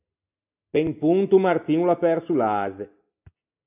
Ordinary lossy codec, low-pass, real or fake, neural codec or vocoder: AAC, 32 kbps; 3.6 kHz; real; none